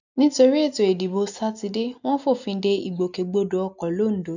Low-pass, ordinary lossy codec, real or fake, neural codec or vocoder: 7.2 kHz; none; real; none